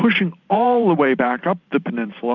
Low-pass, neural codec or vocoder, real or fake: 7.2 kHz; vocoder, 44.1 kHz, 128 mel bands every 512 samples, BigVGAN v2; fake